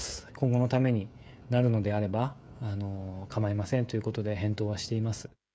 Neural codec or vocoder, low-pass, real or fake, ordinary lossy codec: codec, 16 kHz, 16 kbps, FreqCodec, smaller model; none; fake; none